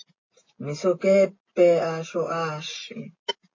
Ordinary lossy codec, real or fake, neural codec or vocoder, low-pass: MP3, 32 kbps; real; none; 7.2 kHz